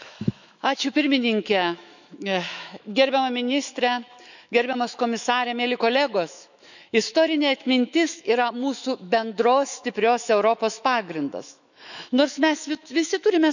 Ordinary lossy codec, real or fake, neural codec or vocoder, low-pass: none; fake; autoencoder, 48 kHz, 128 numbers a frame, DAC-VAE, trained on Japanese speech; 7.2 kHz